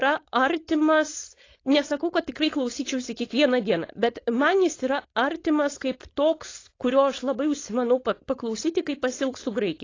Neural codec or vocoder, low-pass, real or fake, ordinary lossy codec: codec, 16 kHz, 4.8 kbps, FACodec; 7.2 kHz; fake; AAC, 32 kbps